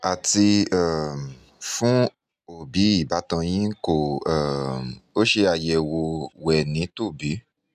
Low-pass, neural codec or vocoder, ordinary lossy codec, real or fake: 14.4 kHz; none; none; real